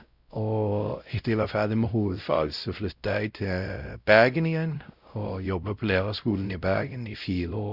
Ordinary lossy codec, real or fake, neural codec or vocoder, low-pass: Opus, 64 kbps; fake; codec, 16 kHz, 0.5 kbps, X-Codec, WavLM features, trained on Multilingual LibriSpeech; 5.4 kHz